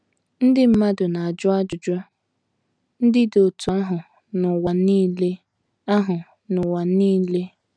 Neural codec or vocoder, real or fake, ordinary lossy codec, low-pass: none; real; none; 9.9 kHz